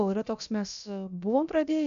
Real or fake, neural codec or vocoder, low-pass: fake; codec, 16 kHz, about 1 kbps, DyCAST, with the encoder's durations; 7.2 kHz